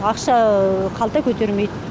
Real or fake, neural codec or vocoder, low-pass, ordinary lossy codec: real; none; none; none